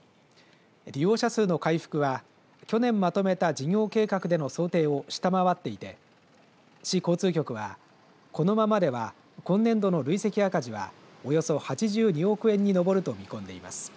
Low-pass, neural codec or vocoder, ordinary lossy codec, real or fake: none; none; none; real